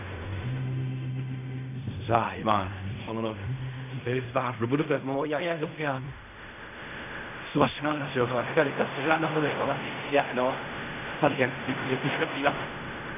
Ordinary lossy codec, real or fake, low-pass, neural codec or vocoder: none; fake; 3.6 kHz; codec, 16 kHz in and 24 kHz out, 0.4 kbps, LongCat-Audio-Codec, fine tuned four codebook decoder